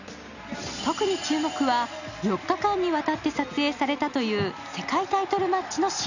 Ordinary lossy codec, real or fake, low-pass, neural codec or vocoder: none; real; 7.2 kHz; none